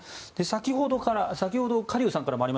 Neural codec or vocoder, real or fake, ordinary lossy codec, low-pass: none; real; none; none